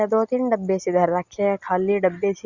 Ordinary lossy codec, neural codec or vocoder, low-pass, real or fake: Opus, 64 kbps; none; 7.2 kHz; real